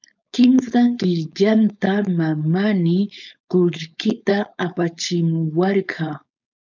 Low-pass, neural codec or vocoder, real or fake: 7.2 kHz; codec, 16 kHz, 4.8 kbps, FACodec; fake